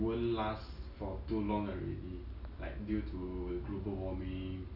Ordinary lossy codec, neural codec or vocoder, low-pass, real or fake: none; none; 5.4 kHz; real